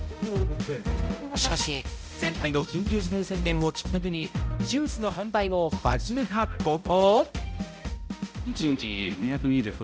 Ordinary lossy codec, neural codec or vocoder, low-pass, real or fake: none; codec, 16 kHz, 0.5 kbps, X-Codec, HuBERT features, trained on balanced general audio; none; fake